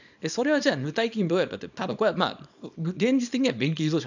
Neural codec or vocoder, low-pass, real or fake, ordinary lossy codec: codec, 24 kHz, 0.9 kbps, WavTokenizer, small release; 7.2 kHz; fake; none